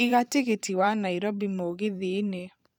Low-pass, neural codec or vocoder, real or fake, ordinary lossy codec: 19.8 kHz; vocoder, 44.1 kHz, 128 mel bands every 512 samples, BigVGAN v2; fake; none